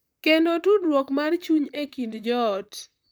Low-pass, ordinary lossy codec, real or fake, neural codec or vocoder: none; none; fake; vocoder, 44.1 kHz, 128 mel bands, Pupu-Vocoder